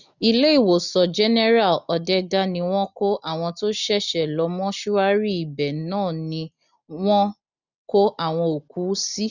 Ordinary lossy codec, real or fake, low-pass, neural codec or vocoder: none; real; 7.2 kHz; none